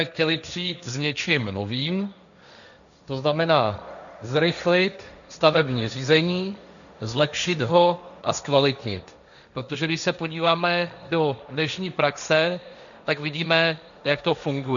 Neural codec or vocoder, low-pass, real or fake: codec, 16 kHz, 1.1 kbps, Voila-Tokenizer; 7.2 kHz; fake